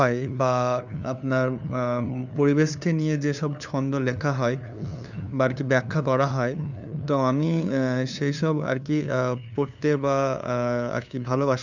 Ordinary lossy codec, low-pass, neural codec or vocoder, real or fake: none; 7.2 kHz; codec, 16 kHz, 2 kbps, FunCodec, trained on LibriTTS, 25 frames a second; fake